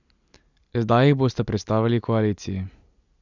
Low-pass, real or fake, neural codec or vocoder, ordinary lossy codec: 7.2 kHz; real; none; none